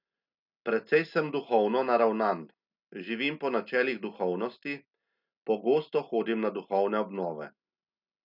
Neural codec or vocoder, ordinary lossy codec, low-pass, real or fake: none; none; 5.4 kHz; real